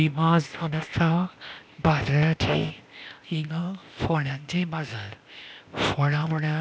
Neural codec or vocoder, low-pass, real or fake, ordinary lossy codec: codec, 16 kHz, 0.8 kbps, ZipCodec; none; fake; none